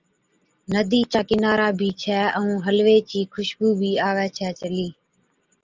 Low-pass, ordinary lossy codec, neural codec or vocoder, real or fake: 7.2 kHz; Opus, 32 kbps; none; real